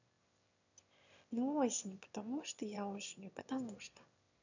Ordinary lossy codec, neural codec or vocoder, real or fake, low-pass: none; autoencoder, 22.05 kHz, a latent of 192 numbers a frame, VITS, trained on one speaker; fake; 7.2 kHz